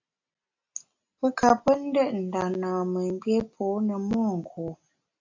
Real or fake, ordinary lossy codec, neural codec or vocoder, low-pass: real; AAC, 48 kbps; none; 7.2 kHz